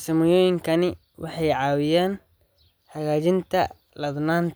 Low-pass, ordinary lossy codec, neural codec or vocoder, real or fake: none; none; none; real